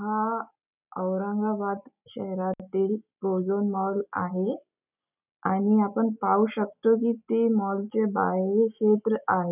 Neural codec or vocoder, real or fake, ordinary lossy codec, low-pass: none; real; none; 3.6 kHz